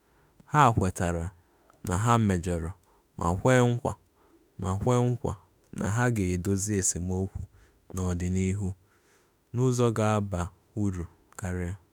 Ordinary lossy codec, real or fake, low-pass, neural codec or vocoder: none; fake; none; autoencoder, 48 kHz, 32 numbers a frame, DAC-VAE, trained on Japanese speech